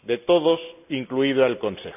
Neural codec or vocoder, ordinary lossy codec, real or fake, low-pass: none; none; real; 3.6 kHz